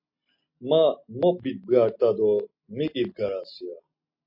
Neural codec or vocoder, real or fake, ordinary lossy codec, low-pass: none; real; MP3, 32 kbps; 5.4 kHz